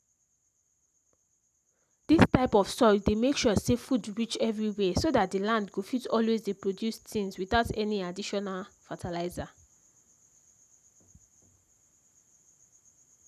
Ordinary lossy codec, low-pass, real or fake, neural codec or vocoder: none; 14.4 kHz; real; none